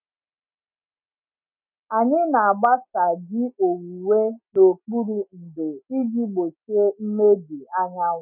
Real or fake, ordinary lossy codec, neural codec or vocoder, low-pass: real; none; none; 3.6 kHz